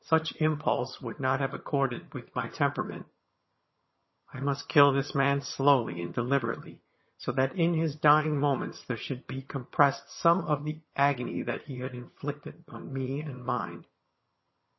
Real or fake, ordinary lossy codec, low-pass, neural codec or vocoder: fake; MP3, 24 kbps; 7.2 kHz; vocoder, 22.05 kHz, 80 mel bands, HiFi-GAN